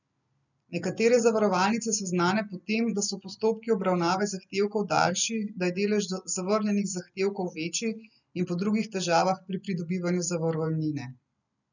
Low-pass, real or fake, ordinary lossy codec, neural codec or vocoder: 7.2 kHz; real; none; none